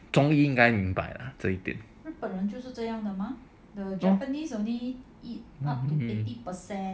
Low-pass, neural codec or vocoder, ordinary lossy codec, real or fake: none; none; none; real